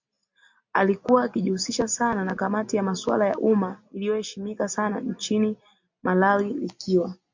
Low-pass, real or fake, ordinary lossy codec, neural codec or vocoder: 7.2 kHz; real; MP3, 48 kbps; none